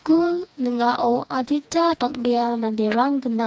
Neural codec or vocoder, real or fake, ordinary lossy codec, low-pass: codec, 16 kHz, 2 kbps, FreqCodec, smaller model; fake; none; none